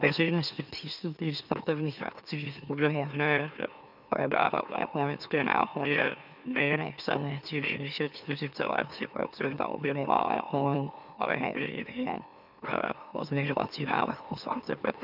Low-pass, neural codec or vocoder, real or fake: 5.4 kHz; autoencoder, 44.1 kHz, a latent of 192 numbers a frame, MeloTTS; fake